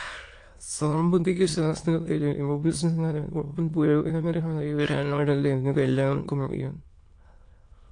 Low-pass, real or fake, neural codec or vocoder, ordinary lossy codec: 9.9 kHz; fake; autoencoder, 22.05 kHz, a latent of 192 numbers a frame, VITS, trained on many speakers; AAC, 48 kbps